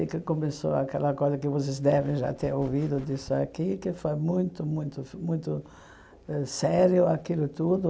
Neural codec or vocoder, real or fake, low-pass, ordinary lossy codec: none; real; none; none